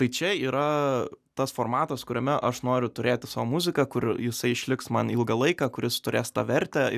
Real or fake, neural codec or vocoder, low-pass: real; none; 14.4 kHz